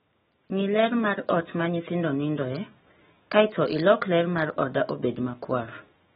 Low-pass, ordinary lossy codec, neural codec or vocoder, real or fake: 19.8 kHz; AAC, 16 kbps; autoencoder, 48 kHz, 128 numbers a frame, DAC-VAE, trained on Japanese speech; fake